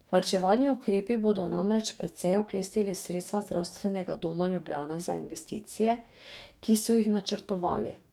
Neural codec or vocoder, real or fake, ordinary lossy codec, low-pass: codec, 44.1 kHz, 2.6 kbps, DAC; fake; none; 19.8 kHz